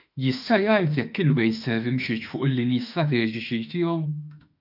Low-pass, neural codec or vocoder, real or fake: 5.4 kHz; autoencoder, 48 kHz, 32 numbers a frame, DAC-VAE, trained on Japanese speech; fake